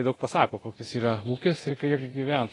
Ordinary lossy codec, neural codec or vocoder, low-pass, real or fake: AAC, 32 kbps; codec, 24 kHz, 0.9 kbps, DualCodec; 10.8 kHz; fake